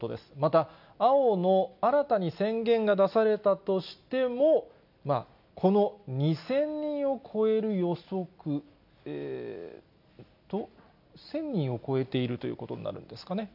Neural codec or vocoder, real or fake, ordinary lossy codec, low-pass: none; real; none; 5.4 kHz